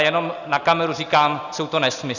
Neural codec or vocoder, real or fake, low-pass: none; real; 7.2 kHz